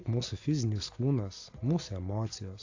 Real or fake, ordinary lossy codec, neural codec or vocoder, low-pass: real; AAC, 48 kbps; none; 7.2 kHz